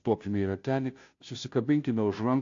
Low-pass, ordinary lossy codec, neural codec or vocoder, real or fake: 7.2 kHz; AAC, 48 kbps; codec, 16 kHz, 0.5 kbps, FunCodec, trained on Chinese and English, 25 frames a second; fake